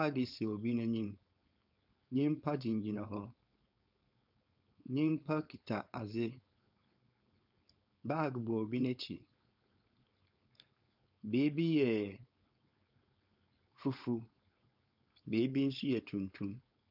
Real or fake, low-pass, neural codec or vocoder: fake; 5.4 kHz; codec, 16 kHz, 4.8 kbps, FACodec